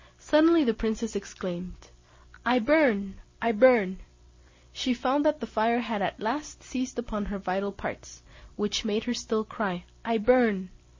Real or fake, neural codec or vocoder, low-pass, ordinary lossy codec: real; none; 7.2 kHz; MP3, 32 kbps